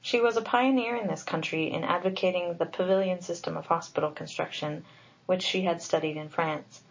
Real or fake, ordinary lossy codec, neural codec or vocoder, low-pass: real; MP3, 32 kbps; none; 7.2 kHz